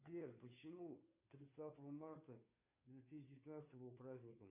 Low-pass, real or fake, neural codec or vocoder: 3.6 kHz; fake; codec, 16 kHz, 2 kbps, FunCodec, trained on Chinese and English, 25 frames a second